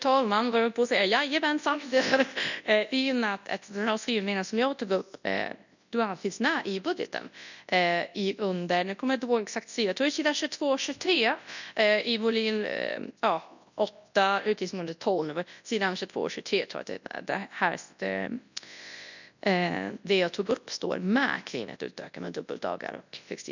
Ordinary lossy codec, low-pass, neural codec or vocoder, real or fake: none; 7.2 kHz; codec, 24 kHz, 0.9 kbps, WavTokenizer, large speech release; fake